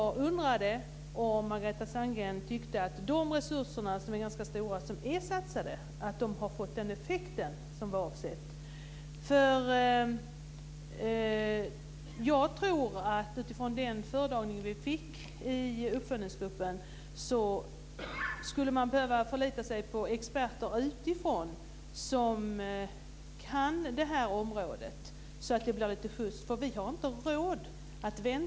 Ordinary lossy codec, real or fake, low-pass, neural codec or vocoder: none; real; none; none